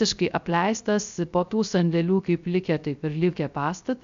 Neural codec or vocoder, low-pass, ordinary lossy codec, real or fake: codec, 16 kHz, 0.3 kbps, FocalCodec; 7.2 kHz; MP3, 64 kbps; fake